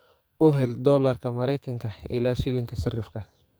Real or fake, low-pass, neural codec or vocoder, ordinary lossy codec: fake; none; codec, 44.1 kHz, 2.6 kbps, SNAC; none